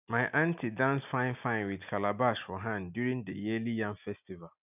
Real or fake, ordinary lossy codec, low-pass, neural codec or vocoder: real; none; 3.6 kHz; none